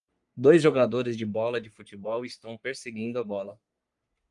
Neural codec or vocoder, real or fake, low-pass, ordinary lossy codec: codec, 44.1 kHz, 3.4 kbps, Pupu-Codec; fake; 10.8 kHz; Opus, 32 kbps